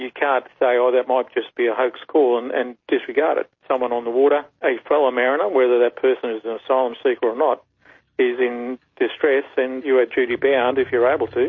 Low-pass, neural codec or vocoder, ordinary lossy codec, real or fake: 7.2 kHz; none; MP3, 32 kbps; real